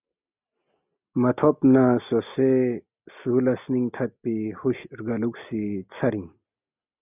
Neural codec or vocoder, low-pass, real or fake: none; 3.6 kHz; real